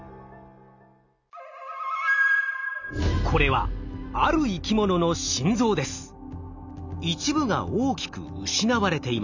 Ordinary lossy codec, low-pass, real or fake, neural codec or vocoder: none; 7.2 kHz; real; none